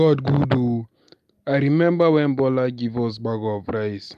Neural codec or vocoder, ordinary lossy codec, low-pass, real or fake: none; none; 14.4 kHz; real